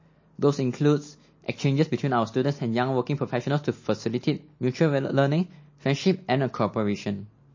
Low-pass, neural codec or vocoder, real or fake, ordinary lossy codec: 7.2 kHz; none; real; MP3, 32 kbps